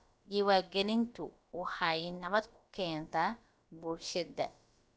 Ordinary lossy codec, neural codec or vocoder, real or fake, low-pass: none; codec, 16 kHz, about 1 kbps, DyCAST, with the encoder's durations; fake; none